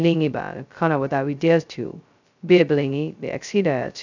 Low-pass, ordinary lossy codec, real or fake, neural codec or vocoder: 7.2 kHz; none; fake; codec, 16 kHz, 0.2 kbps, FocalCodec